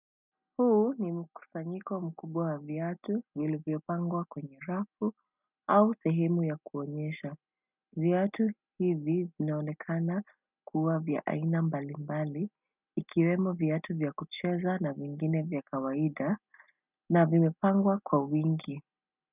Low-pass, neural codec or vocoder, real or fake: 3.6 kHz; none; real